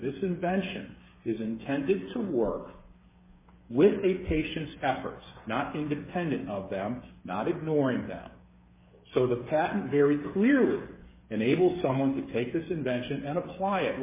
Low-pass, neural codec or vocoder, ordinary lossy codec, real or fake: 3.6 kHz; codec, 16 kHz, 8 kbps, FreqCodec, smaller model; MP3, 16 kbps; fake